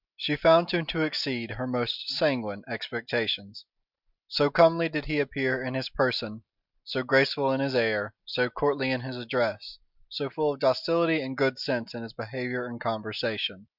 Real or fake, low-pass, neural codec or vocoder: real; 5.4 kHz; none